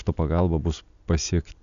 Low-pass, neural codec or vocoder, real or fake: 7.2 kHz; none; real